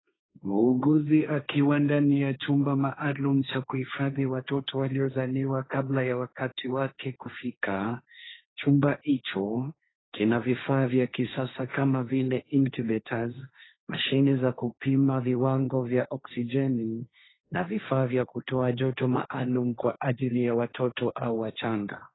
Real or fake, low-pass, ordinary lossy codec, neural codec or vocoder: fake; 7.2 kHz; AAC, 16 kbps; codec, 16 kHz, 1.1 kbps, Voila-Tokenizer